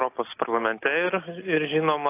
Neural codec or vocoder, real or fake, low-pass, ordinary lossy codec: none; real; 3.6 kHz; AAC, 24 kbps